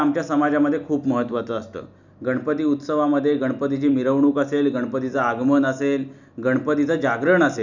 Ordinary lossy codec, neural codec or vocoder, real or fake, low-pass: none; none; real; 7.2 kHz